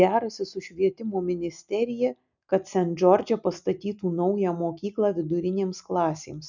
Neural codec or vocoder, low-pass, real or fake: none; 7.2 kHz; real